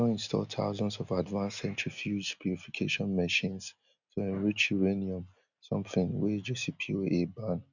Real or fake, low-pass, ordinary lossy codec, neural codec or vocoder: real; 7.2 kHz; none; none